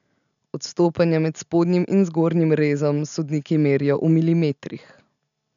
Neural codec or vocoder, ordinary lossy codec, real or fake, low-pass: none; none; real; 7.2 kHz